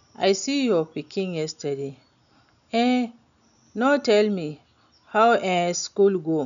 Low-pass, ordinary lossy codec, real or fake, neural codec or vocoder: 7.2 kHz; none; real; none